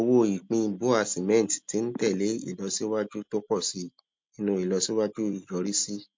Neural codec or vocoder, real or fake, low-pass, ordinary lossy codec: none; real; 7.2 kHz; MP3, 48 kbps